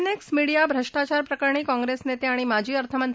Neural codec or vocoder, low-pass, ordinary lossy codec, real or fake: none; none; none; real